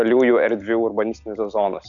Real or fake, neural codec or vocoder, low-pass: real; none; 7.2 kHz